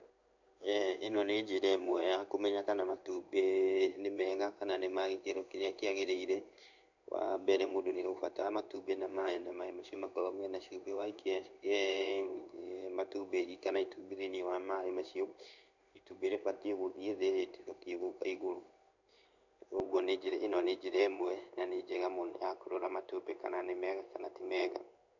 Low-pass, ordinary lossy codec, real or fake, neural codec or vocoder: 7.2 kHz; none; fake; codec, 16 kHz in and 24 kHz out, 1 kbps, XY-Tokenizer